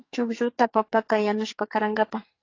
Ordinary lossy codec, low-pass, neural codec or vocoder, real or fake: AAC, 32 kbps; 7.2 kHz; codec, 44.1 kHz, 2.6 kbps, SNAC; fake